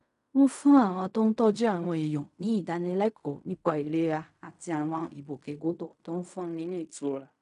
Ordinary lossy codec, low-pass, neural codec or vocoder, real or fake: none; 10.8 kHz; codec, 16 kHz in and 24 kHz out, 0.4 kbps, LongCat-Audio-Codec, fine tuned four codebook decoder; fake